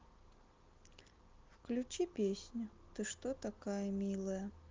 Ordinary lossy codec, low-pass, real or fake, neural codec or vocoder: Opus, 32 kbps; 7.2 kHz; real; none